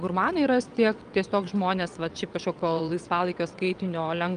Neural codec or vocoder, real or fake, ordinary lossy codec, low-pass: vocoder, 22.05 kHz, 80 mel bands, WaveNeXt; fake; Opus, 32 kbps; 9.9 kHz